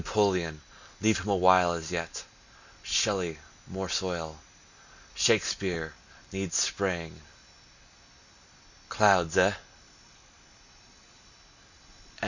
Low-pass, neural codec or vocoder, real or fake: 7.2 kHz; none; real